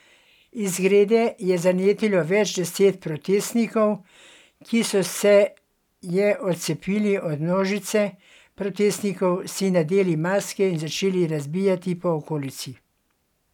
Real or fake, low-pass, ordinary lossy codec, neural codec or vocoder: real; 19.8 kHz; none; none